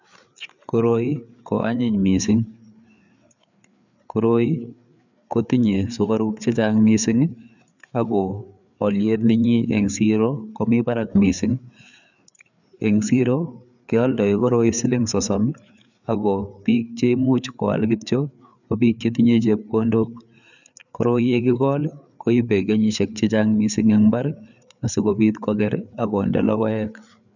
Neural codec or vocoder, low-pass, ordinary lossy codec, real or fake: codec, 16 kHz, 4 kbps, FreqCodec, larger model; 7.2 kHz; none; fake